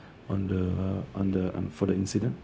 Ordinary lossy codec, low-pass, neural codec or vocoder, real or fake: none; none; codec, 16 kHz, 0.4 kbps, LongCat-Audio-Codec; fake